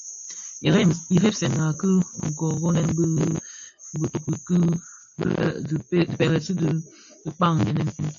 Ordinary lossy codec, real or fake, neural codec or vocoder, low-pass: AAC, 64 kbps; real; none; 7.2 kHz